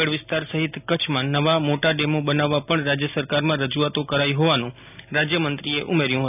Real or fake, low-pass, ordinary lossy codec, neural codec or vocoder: real; 3.6 kHz; none; none